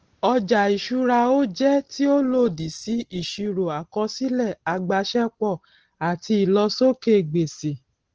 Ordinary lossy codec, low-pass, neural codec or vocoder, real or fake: Opus, 16 kbps; 7.2 kHz; none; real